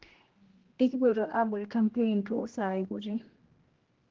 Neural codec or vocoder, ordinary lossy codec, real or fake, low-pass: codec, 16 kHz, 1 kbps, X-Codec, HuBERT features, trained on general audio; Opus, 16 kbps; fake; 7.2 kHz